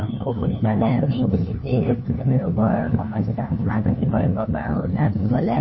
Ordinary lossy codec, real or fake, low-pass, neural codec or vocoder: MP3, 24 kbps; fake; 7.2 kHz; codec, 16 kHz, 1 kbps, FunCodec, trained on LibriTTS, 50 frames a second